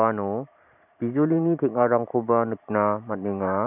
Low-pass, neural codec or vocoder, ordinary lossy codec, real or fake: 3.6 kHz; vocoder, 44.1 kHz, 128 mel bands every 512 samples, BigVGAN v2; none; fake